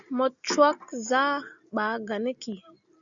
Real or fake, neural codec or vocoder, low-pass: real; none; 7.2 kHz